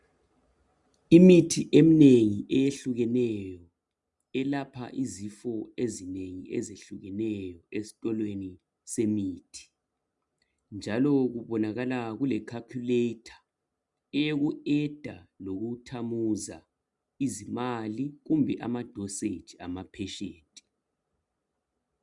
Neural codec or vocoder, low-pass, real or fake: none; 10.8 kHz; real